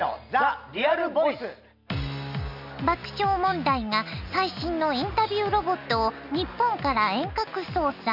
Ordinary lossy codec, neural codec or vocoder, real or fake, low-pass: none; none; real; 5.4 kHz